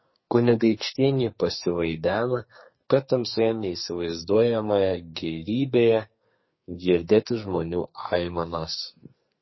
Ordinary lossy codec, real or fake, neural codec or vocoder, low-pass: MP3, 24 kbps; fake; codec, 44.1 kHz, 2.6 kbps, SNAC; 7.2 kHz